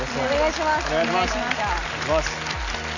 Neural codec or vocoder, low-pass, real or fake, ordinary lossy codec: none; 7.2 kHz; real; none